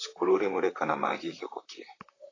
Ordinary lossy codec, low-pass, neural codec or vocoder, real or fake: AAC, 32 kbps; 7.2 kHz; vocoder, 44.1 kHz, 128 mel bands, Pupu-Vocoder; fake